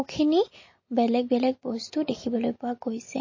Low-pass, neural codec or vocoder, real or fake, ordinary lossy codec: 7.2 kHz; none; real; MP3, 32 kbps